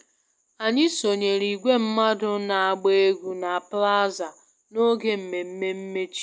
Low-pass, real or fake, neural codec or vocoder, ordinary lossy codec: none; real; none; none